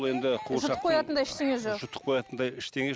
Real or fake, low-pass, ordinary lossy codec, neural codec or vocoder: real; none; none; none